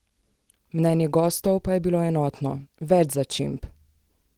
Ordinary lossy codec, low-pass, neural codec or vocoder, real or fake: Opus, 16 kbps; 19.8 kHz; none; real